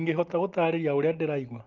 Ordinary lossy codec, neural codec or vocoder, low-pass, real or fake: Opus, 32 kbps; none; 7.2 kHz; real